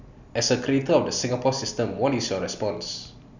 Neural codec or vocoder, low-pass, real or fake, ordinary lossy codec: none; 7.2 kHz; real; none